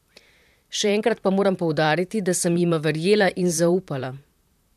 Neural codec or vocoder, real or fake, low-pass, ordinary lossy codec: vocoder, 44.1 kHz, 128 mel bands, Pupu-Vocoder; fake; 14.4 kHz; none